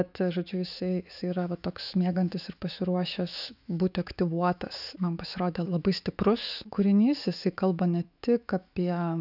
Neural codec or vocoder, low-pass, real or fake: autoencoder, 48 kHz, 128 numbers a frame, DAC-VAE, trained on Japanese speech; 5.4 kHz; fake